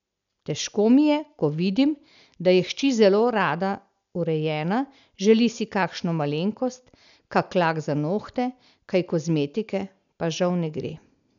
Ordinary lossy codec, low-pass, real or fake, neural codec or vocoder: none; 7.2 kHz; real; none